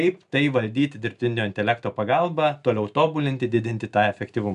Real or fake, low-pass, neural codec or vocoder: real; 10.8 kHz; none